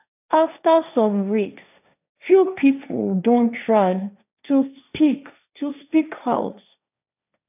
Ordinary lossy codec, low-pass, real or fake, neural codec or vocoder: none; 3.6 kHz; fake; codec, 16 kHz, 1.1 kbps, Voila-Tokenizer